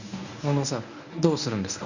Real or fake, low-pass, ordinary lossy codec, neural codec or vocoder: fake; 7.2 kHz; none; codec, 24 kHz, 0.9 kbps, WavTokenizer, medium speech release version 1